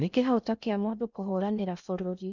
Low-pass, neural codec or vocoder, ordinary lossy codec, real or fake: 7.2 kHz; codec, 16 kHz in and 24 kHz out, 0.6 kbps, FocalCodec, streaming, 2048 codes; none; fake